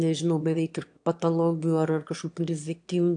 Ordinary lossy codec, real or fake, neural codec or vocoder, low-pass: AAC, 64 kbps; fake; autoencoder, 22.05 kHz, a latent of 192 numbers a frame, VITS, trained on one speaker; 9.9 kHz